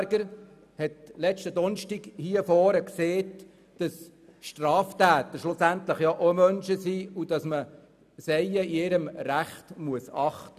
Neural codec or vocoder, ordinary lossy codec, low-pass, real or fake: none; none; 14.4 kHz; real